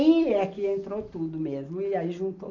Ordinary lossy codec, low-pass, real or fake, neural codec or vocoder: none; 7.2 kHz; real; none